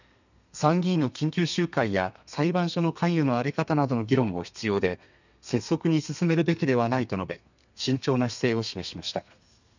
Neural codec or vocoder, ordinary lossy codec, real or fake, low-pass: codec, 32 kHz, 1.9 kbps, SNAC; none; fake; 7.2 kHz